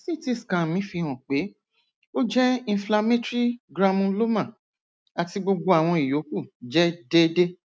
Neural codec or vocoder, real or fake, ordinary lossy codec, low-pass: none; real; none; none